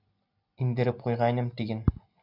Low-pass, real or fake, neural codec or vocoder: 5.4 kHz; real; none